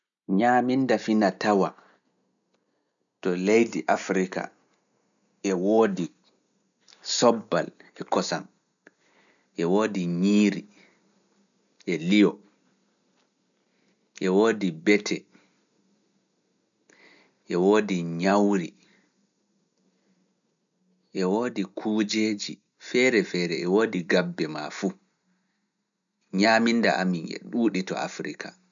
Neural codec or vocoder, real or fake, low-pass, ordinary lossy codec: none; real; 7.2 kHz; none